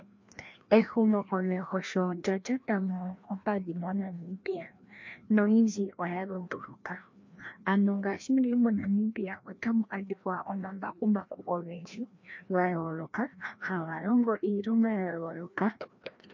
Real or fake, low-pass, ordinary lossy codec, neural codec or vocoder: fake; 7.2 kHz; MP3, 48 kbps; codec, 16 kHz, 1 kbps, FreqCodec, larger model